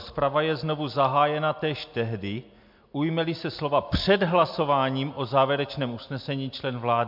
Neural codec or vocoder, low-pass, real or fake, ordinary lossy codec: none; 5.4 kHz; real; MP3, 48 kbps